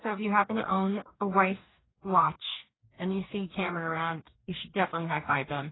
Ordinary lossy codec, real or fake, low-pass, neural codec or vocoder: AAC, 16 kbps; fake; 7.2 kHz; codec, 44.1 kHz, 2.6 kbps, DAC